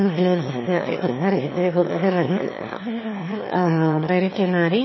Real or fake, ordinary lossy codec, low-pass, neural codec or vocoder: fake; MP3, 24 kbps; 7.2 kHz; autoencoder, 22.05 kHz, a latent of 192 numbers a frame, VITS, trained on one speaker